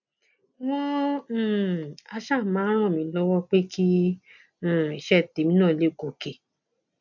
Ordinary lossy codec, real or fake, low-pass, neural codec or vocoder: none; real; 7.2 kHz; none